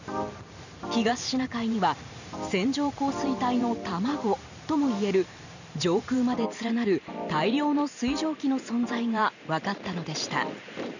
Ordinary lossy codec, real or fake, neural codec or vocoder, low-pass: none; fake; vocoder, 44.1 kHz, 128 mel bands every 512 samples, BigVGAN v2; 7.2 kHz